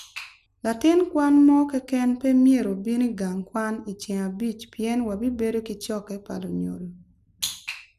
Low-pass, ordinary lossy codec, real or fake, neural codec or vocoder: 14.4 kHz; none; real; none